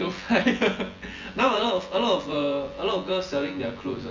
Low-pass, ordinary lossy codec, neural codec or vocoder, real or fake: 7.2 kHz; Opus, 32 kbps; vocoder, 24 kHz, 100 mel bands, Vocos; fake